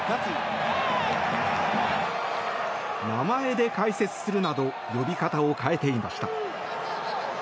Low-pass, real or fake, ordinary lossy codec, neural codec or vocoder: none; real; none; none